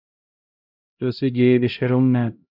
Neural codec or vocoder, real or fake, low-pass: codec, 16 kHz, 0.5 kbps, X-Codec, HuBERT features, trained on LibriSpeech; fake; 5.4 kHz